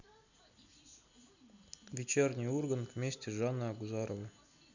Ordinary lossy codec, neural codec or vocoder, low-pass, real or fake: none; none; 7.2 kHz; real